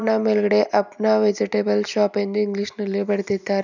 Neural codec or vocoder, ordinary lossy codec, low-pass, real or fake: none; none; 7.2 kHz; real